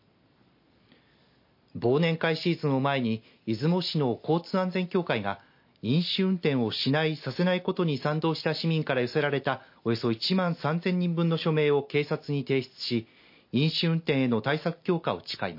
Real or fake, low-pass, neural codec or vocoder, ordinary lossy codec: real; 5.4 kHz; none; MP3, 32 kbps